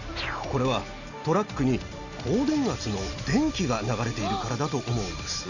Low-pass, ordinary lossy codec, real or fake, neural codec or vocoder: 7.2 kHz; none; real; none